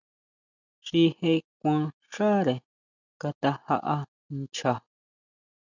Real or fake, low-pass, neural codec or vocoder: real; 7.2 kHz; none